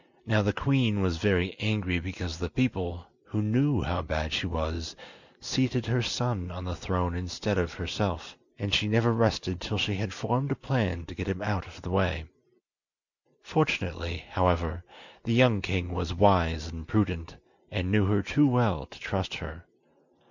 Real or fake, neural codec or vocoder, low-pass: real; none; 7.2 kHz